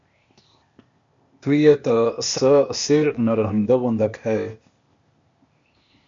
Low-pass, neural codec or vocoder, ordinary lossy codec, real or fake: 7.2 kHz; codec, 16 kHz, 0.8 kbps, ZipCodec; MP3, 48 kbps; fake